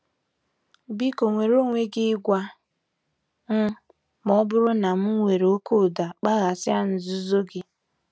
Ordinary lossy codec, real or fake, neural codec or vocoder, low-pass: none; real; none; none